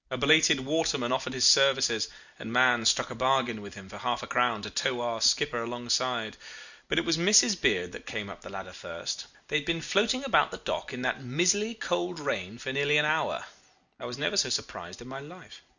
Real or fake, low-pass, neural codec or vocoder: real; 7.2 kHz; none